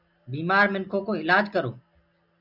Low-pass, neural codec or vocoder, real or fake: 5.4 kHz; none; real